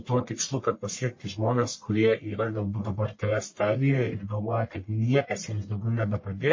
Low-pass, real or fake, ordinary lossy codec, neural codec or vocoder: 7.2 kHz; fake; MP3, 32 kbps; codec, 44.1 kHz, 1.7 kbps, Pupu-Codec